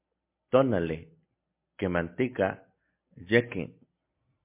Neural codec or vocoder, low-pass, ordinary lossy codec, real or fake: none; 3.6 kHz; MP3, 24 kbps; real